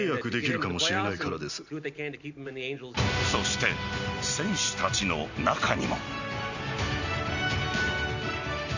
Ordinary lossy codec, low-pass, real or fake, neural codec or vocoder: none; 7.2 kHz; real; none